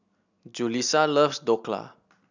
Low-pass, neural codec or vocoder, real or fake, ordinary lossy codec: 7.2 kHz; none; real; none